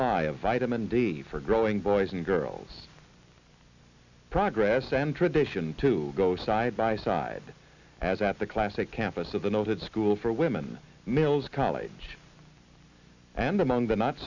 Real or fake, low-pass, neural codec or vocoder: real; 7.2 kHz; none